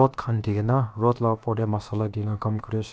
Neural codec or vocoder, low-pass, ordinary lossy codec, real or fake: codec, 16 kHz, about 1 kbps, DyCAST, with the encoder's durations; none; none; fake